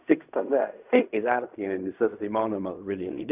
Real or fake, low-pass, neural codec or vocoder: fake; 3.6 kHz; codec, 16 kHz in and 24 kHz out, 0.4 kbps, LongCat-Audio-Codec, fine tuned four codebook decoder